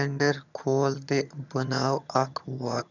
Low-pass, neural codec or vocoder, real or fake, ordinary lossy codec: 7.2 kHz; vocoder, 22.05 kHz, 80 mel bands, HiFi-GAN; fake; none